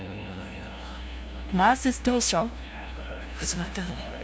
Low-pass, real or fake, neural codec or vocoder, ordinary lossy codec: none; fake; codec, 16 kHz, 0.5 kbps, FunCodec, trained on LibriTTS, 25 frames a second; none